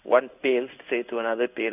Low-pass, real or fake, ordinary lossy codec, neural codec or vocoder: 3.6 kHz; fake; none; codec, 16 kHz in and 24 kHz out, 1 kbps, XY-Tokenizer